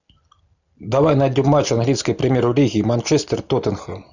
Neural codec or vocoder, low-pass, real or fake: none; 7.2 kHz; real